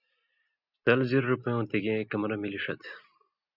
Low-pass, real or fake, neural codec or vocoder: 5.4 kHz; real; none